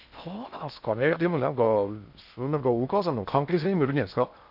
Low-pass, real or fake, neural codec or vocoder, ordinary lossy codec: 5.4 kHz; fake; codec, 16 kHz in and 24 kHz out, 0.6 kbps, FocalCodec, streaming, 2048 codes; none